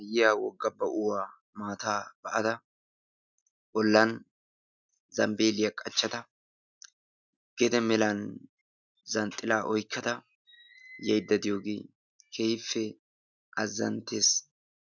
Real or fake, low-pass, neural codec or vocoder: real; 7.2 kHz; none